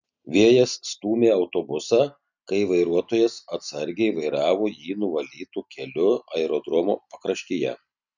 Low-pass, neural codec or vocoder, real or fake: 7.2 kHz; none; real